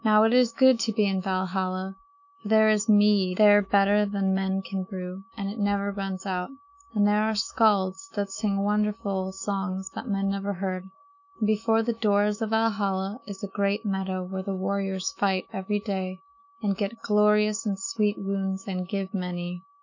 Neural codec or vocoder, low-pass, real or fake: autoencoder, 48 kHz, 128 numbers a frame, DAC-VAE, trained on Japanese speech; 7.2 kHz; fake